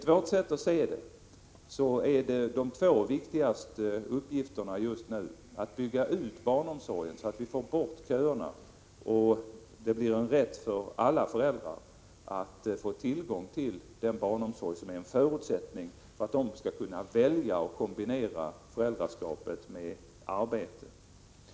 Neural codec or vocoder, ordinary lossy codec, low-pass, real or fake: none; none; none; real